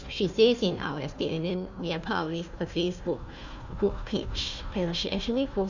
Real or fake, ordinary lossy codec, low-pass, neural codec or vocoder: fake; none; 7.2 kHz; codec, 16 kHz, 1 kbps, FunCodec, trained on Chinese and English, 50 frames a second